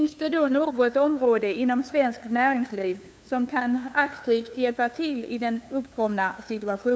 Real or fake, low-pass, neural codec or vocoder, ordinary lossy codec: fake; none; codec, 16 kHz, 2 kbps, FunCodec, trained on LibriTTS, 25 frames a second; none